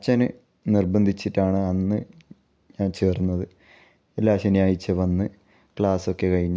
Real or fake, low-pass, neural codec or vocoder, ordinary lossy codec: real; none; none; none